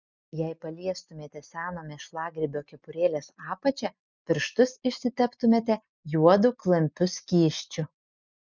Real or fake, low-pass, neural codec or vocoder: real; 7.2 kHz; none